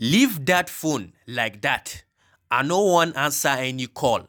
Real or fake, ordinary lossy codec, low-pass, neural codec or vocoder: real; none; none; none